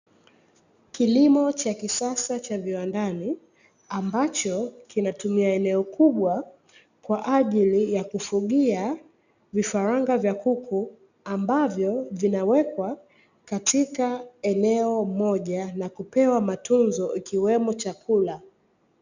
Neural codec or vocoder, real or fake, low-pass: none; real; 7.2 kHz